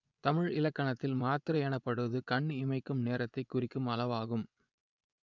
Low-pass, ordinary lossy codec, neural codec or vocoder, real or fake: 7.2 kHz; none; vocoder, 24 kHz, 100 mel bands, Vocos; fake